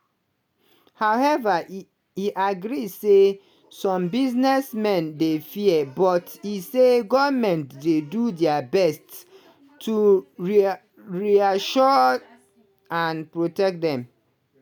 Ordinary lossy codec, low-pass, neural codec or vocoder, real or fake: none; none; none; real